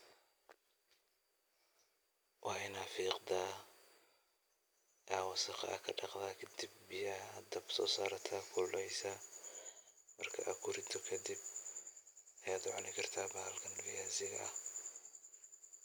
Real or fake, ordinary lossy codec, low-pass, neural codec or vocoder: real; none; none; none